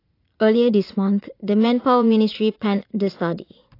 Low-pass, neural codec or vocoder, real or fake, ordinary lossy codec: 5.4 kHz; vocoder, 44.1 kHz, 80 mel bands, Vocos; fake; AAC, 32 kbps